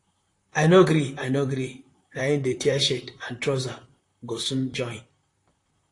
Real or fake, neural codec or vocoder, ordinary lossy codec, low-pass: fake; vocoder, 44.1 kHz, 128 mel bands, Pupu-Vocoder; AAC, 48 kbps; 10.8 kHz